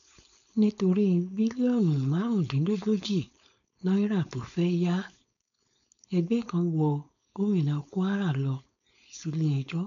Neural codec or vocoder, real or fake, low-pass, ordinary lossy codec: codec, 16 kHz, 4.8 kbps, FACodec; fake; 7.2 kHz; none